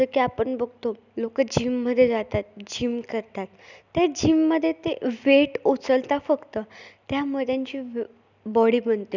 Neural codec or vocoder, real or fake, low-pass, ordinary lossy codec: none; real; 7.2 kHz; none